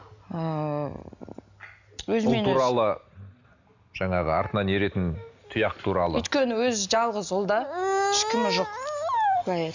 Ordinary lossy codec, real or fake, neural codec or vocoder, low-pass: none; real; none; 7.2 kHz